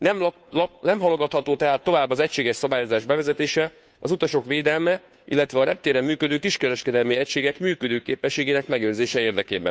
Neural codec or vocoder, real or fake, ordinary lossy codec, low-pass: codec, 16 kHz, 2 kbps, FunCodec, trained on Chinese and English, 25 frames a second; fake; none; none